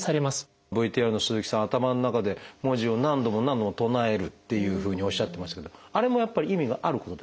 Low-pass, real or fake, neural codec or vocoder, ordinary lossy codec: none; real; none; none